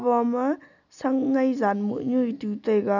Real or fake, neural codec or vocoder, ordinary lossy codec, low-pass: real; none; none; 7.2 kHz